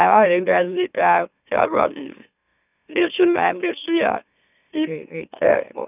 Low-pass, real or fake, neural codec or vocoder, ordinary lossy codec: 3.6 kHz; fake; autoencoder, 44.1 kHz, a latent of 192 numbers a frame, MeloTTS; none